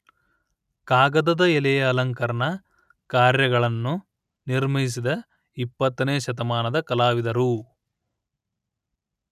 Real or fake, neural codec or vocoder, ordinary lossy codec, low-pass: real; none; none; 14.4 kHz